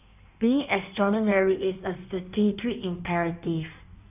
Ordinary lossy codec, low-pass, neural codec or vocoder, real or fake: none; 3.6 kHz; codec, 16 kHz in and 24 kHz out, 1.1 kbps, FireRedTTS-2 codec; fake